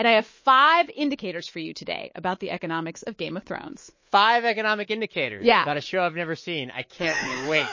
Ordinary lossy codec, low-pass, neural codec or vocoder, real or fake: MP3, 32 kbps; 7.2 kHz; codec, 24 kHz, 3.1 kbps, DualCodec; fake